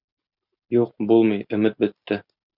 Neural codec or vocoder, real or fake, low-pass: none; real; 5.4 kHz